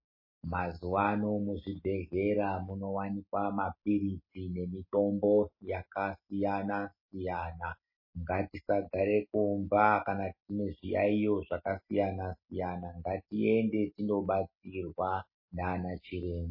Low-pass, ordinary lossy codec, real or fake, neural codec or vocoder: 5.4 kHz; MP3, 24 kbps; real; none